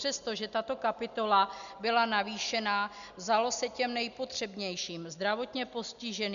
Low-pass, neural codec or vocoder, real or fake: 7.2 kHz; none; real